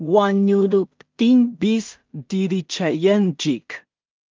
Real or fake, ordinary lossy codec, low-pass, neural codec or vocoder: fake; Opus, 24 kbps; 7.2 kHz; codec, 16 kHz in and 24 kHz out, 0.4 kbps, LongCat-Audio-Codec, two codebook decoder